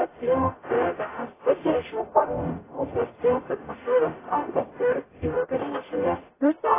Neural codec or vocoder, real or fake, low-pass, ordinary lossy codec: codec, 44.1 kHz, 0.9 kbps, DAC; fake; 3.6 kHz; AAC, 16 kbps